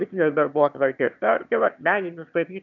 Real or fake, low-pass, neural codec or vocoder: fake; 7.2 kHz; autoencoder, 22.05 kHz, a latent of 192 numbers a frame, VITS, trained on one speaker